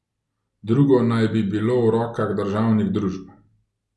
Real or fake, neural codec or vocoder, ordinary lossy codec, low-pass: real; none; none; none